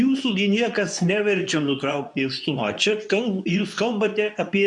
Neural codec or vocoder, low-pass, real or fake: codec, 24 kHz, 0.9 kbps, WavTokenizer, medium speech release version 2; 10.8 kHz; fake